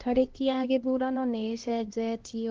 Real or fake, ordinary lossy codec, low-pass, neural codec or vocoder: fake; Opus, 16 kbps; 7.2 kHz; codec, 16 kHz, 1 kbps, X-Codec, HuBERT features, trained on LibriSpeech